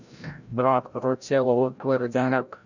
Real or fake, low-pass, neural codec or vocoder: fake; 7.2 kHz; codec, 16 kHz, 0.5 kbps, FreqCodec, larger model